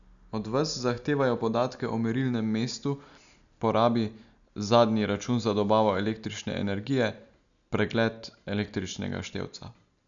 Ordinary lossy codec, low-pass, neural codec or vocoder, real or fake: none; 7.2 kHz; none; real